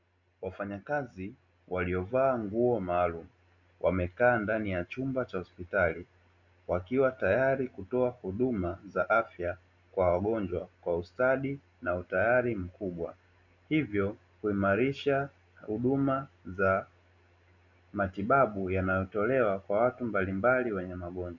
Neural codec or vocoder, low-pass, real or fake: none; 7.2 kHz; real